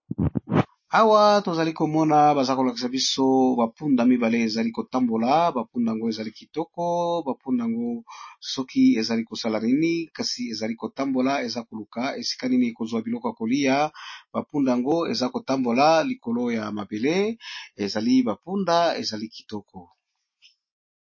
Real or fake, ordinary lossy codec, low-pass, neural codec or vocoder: real; MP3, 32 kbps; 7.2 kHz; none